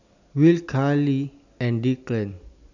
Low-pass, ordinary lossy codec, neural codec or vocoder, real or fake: 7.2 kHz; none; none; real